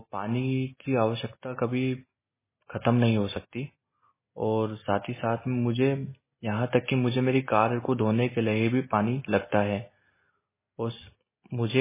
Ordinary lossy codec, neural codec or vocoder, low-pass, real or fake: MP3, 16 kbps; none; 3.6 kHz; real